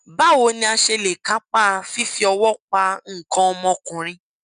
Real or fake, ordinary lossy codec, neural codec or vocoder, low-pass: real; none; none; 9.9 kHz